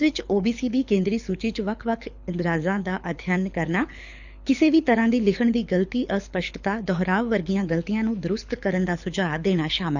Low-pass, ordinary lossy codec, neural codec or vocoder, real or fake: 7.2 kHz; none; codec, 24 kHz, 6 kbps, HILCodec; fake